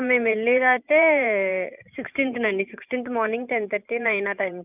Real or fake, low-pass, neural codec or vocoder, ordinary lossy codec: fake; 3.6 kHz; vocoder, 44.1 kHz, 128 mel bands every 512 samples, BigVGAN v2; none